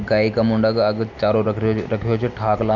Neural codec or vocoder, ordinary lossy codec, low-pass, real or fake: none; none; 7.2 kHz; real